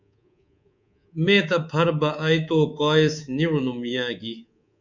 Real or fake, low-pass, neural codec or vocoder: fake; 7.2 kHz; codec, 24 kHz, 3.1 kbps, DualCodec